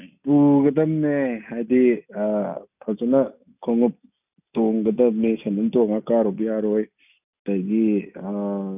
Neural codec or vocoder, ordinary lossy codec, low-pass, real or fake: none; none; 3.6 kHz; real